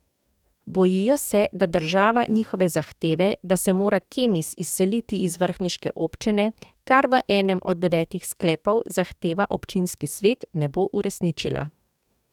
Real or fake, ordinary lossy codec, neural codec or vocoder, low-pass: fake; none; codec, 44.1 kHz, 2.6 kbps, DAC; 19.8 kHz